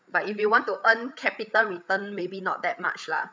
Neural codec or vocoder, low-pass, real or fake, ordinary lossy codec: codec, 16 kHz, 8 kbps, FreqCodec, larger model; none; fake; none